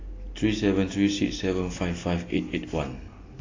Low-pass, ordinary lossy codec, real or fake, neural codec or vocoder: 7.2 kHz; AAC, 32 kbps; real; none